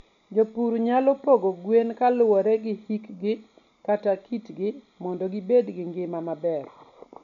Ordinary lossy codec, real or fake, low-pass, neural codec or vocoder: none; real; 7.2 kHz; none